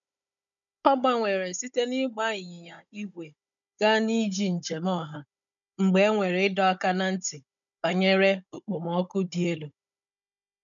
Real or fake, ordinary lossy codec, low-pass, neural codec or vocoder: fake; none; 7.2 kHz; codec, 16 kHz, 16 kbps, FunCodec, trained on Chinese and English, 50 frames a second